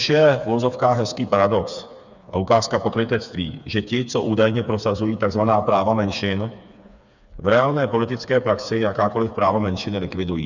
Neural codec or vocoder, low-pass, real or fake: codec, 16 kHz, 4 kbps, FreqCodec, smaller model; 7.2 kHz; fake